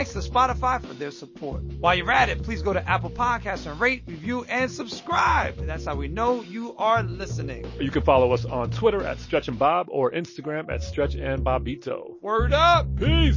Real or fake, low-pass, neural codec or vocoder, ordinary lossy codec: real; 7.2 kHz; none; MP3, 32 kbps